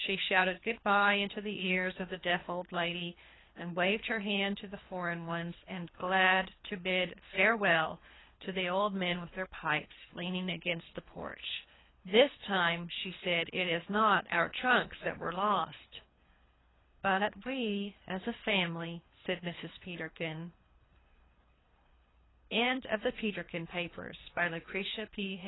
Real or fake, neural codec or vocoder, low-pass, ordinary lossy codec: fake; codec, 24 kHz, 3 kbps, HILCodec; 7.2 kHz; AAC, 16 kbps